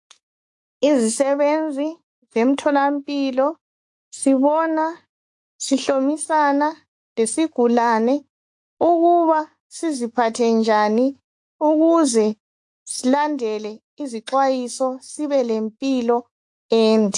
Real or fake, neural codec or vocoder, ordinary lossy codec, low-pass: fake; autoencoder, 48 kHz, 128 numbers a frame, DAC-VAE, trained on Japanese speech; AAC, 64 kbps; 10.8 kHz